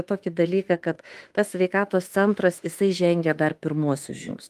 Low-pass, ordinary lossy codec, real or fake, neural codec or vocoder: 14.4 kHz; Opus, 32 kbps; fake; autoencoder, 48 kHz, 32 numbers a frame, DAC-VAE, trained on Japanese speech